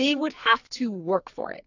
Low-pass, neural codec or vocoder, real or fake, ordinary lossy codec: 7.2 kHz; codec, 44.1 kHz, 2.6 kbps, SNAC; fake; AAC, 48 kbps